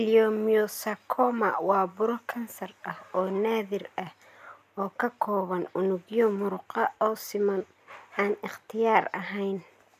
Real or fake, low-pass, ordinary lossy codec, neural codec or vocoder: real; 14.4 kHz; none; none